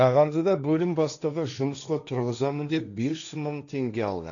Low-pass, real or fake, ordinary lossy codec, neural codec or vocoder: 7.2 kHz; fake; none; codec, 16 kHz, 1.1 kbps, Voila-Tokenizer